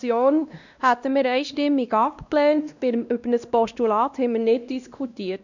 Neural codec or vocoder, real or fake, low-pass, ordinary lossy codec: codec, 16 kHz, 1 kbps, X-Codec, WavLM features, trained on Multilingual LibriSpeech; fake; 7.2 kHz; none